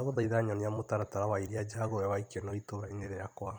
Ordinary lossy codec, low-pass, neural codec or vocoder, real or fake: none; 19.8 kHz; vocoder, 44.1 kHz, 128 mel bands every 256 samples, BigVGAN v2; fake